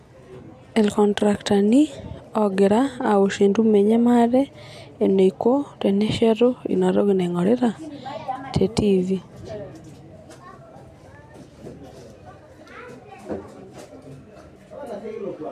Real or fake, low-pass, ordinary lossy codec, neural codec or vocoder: real; 14.4 kHz; none; none